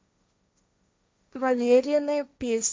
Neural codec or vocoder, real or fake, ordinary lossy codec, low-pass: codec, 16 kHz, 1.1 kbps, Voila-Tokenizer; fake; none; none